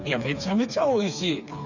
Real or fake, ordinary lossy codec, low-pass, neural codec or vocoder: fake; none; 7.2 kHz; codec, 16 kHz, 4 kbps, FreqCodec, smaller model